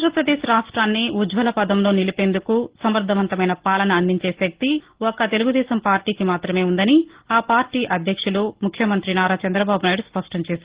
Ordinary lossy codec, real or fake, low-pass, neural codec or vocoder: Opus, 16 kbps; real; 3.6 kHz; none